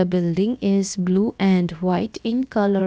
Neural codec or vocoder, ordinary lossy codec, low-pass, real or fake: codec, 16 kHz, about 1 kbps, DyCAST, with the encoder's durations; none; none; fake